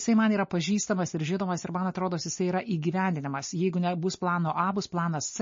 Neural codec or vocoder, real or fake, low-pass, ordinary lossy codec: none; real; 7.2 kHz; MP3, 32 kbps